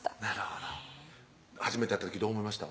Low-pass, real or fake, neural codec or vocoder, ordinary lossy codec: none; real; none; none